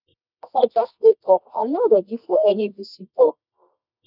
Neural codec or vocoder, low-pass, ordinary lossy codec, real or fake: codec, 24 kHz, 0.9 kbps, WavTokenizer, medium music audio release; 5.4 kHz; none; fake